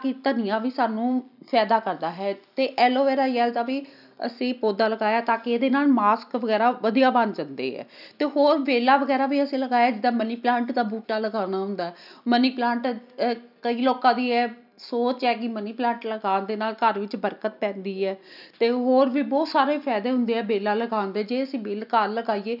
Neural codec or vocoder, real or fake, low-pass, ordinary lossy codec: none; real; 5.4 kHz; none